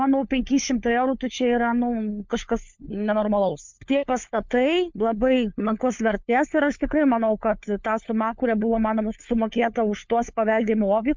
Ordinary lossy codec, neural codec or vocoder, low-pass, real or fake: MP3, 64 kbps; codec, 16 kHz, 4 kbps, FunCodec, trained on LibriTTS, 50 frames a second; 7.2 kHz; fake